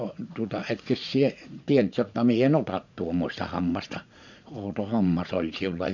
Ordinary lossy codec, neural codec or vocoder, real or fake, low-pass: none; vocoder, 44.1 kHz, 80 mel bands, Vocos; fake; 7.2 kHz